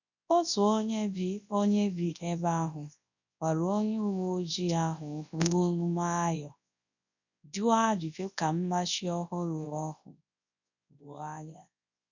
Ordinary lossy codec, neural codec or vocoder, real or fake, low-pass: none; codec, 24 kHz, 0.9 kbps, WavTokenizer, large speech release; fake; 7.2 kHz